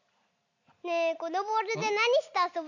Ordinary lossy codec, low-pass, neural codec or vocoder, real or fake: none; 7.2 kHz; none; real